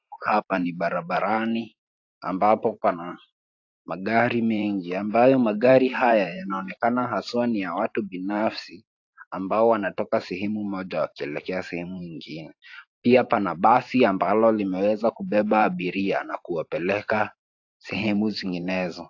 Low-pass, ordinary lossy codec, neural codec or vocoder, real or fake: 7.2 kHz; AAC, 48 kbps; vocoder, 44.1 kHz, 128 mel bands every 512 samples, BigVGAN v2; fake